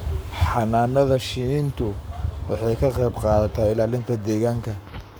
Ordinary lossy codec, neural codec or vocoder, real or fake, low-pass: none; codec, 44.1 kHz, 7.8 kbps, Pupu-Codec; fake; none